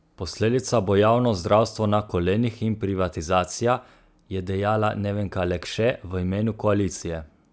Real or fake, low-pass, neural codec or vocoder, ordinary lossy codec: real; none; none; none